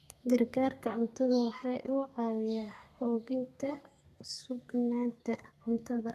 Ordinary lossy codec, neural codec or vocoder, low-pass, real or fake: none; codec, 32 kHz, 1.9 kbps, SNAC; 14.4 kHz; fake